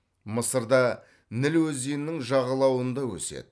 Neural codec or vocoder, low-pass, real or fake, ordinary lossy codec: none; none; real; none